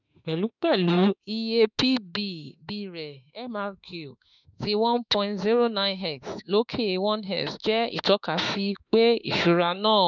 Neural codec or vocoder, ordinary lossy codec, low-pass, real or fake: autoencoder, 48 kHz, 32 numbers a frame, DAC-VAE, trained on Japanese speech; none; 7.2 kHz; fake